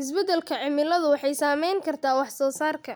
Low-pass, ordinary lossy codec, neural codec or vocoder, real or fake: none; none; none; real